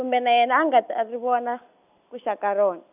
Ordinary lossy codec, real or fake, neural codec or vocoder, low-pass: none; real; none; 3.6 kHz